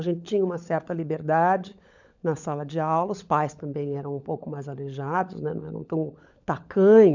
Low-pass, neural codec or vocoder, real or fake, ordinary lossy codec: 7.2 kHz; codec, 16 kHz, 16 kbps, FunCodec, trained on LibriTTS, 50 frames a second; fake; none